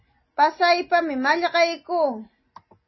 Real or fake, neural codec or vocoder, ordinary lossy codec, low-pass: real; none; MP3, 24 kbps; 7.2 kHz